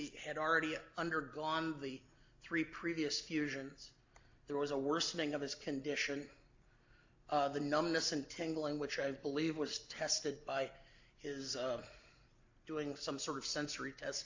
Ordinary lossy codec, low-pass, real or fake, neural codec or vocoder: AAC, 48 kbps; 7.2 kHz; real; none